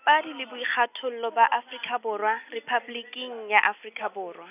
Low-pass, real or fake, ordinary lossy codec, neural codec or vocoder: 3.6 kHz; real; none; none